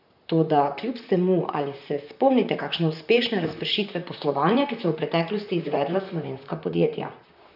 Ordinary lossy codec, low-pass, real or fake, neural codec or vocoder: none; 5.4 kHz; fake; vocoder, 44.1 kHz, 128 mel bands, Pupu-Vocoder